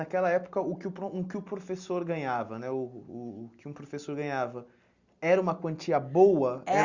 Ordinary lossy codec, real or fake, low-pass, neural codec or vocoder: Opus, 64 kbps; real; 7.2 kHz; none